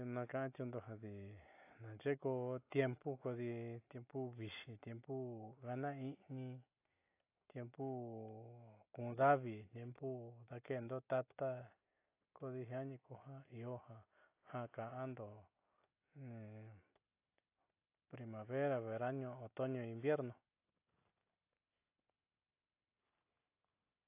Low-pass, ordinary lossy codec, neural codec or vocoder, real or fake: 3.6 kHz; AAC, 24 kbps; none; real